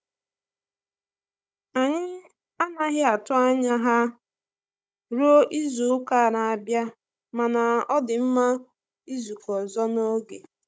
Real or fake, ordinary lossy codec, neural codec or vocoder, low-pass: fake; none; codec, 16 kHz, 16 kbps, FunCodec, trained on Chinese and English, 50 frames a second; none